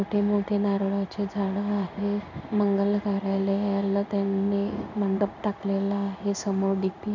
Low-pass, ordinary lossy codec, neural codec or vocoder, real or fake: 7.2 kHz; none; codec, 16 kHz in and 24 kHz out, 1 kbps, XY-Tokenizer; fake